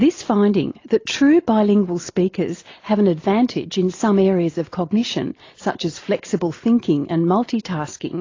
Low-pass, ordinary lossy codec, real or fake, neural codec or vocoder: 7.2 kHz; AAC, 32 kbps; real; none